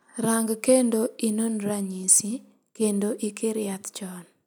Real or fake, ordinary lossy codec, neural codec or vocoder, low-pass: real; none; none; none